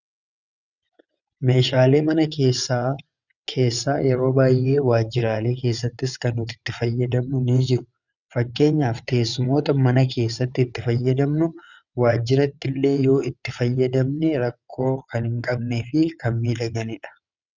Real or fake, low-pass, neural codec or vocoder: fake; 7.2 kHz; vocoder, 22.05 kHz, 80 mel bands, WaveNeXt